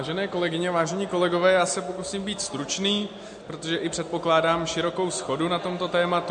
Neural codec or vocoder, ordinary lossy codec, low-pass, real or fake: none; MP3, 48 kbps; 9.9 kHz; real